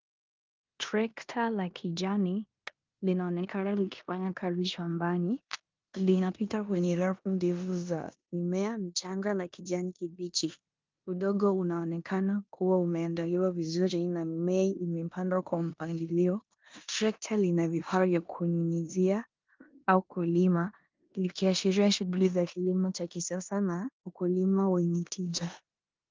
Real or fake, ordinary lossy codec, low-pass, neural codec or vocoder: fake; Opus, 32 kbps; 7.2 kHz; codec, 16 kHz in and 24 kHz out, 0.9 kbps, LongCat-Audio-Codec, four codebook decoder